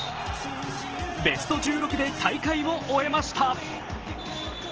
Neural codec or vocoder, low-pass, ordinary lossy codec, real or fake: none; 7.2 kHz; Opus, 16 kbps; real